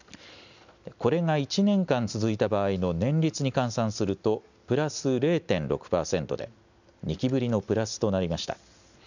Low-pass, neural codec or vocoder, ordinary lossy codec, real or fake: 7.2 kHz; none; none; real